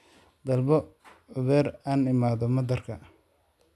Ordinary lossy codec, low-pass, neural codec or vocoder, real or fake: none; none; none; real